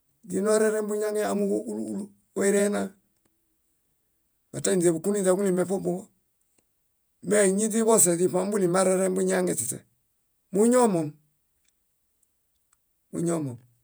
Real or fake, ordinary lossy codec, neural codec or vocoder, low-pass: fake; none; vocoder, 48 kHz, 128 mel bands, Vocos; none